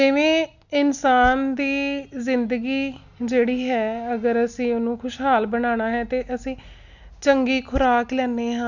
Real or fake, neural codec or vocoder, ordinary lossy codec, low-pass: real; none; none; 7.2 kHz